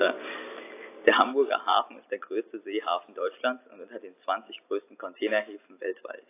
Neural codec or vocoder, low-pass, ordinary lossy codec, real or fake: none; 3.6 kHz; AAC, 24 kbps; real